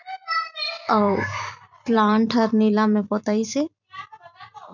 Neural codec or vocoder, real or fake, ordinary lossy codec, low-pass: vocoder, 44.1 kHz, 128 mel bands every 256 samples, BigVGAN v2; fake; none; 7.2 kHz